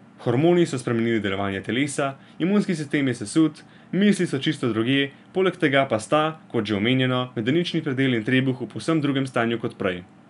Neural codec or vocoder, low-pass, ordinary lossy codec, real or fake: none; 10.8 kHz; none; real